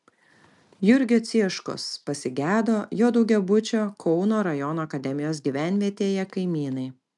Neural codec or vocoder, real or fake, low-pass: none; real; 10.8 kHz